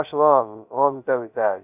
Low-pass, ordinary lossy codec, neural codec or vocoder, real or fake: 3.6 kHz; none; codec, 16 kHz, 0.2 kbps, FocalCodec; fake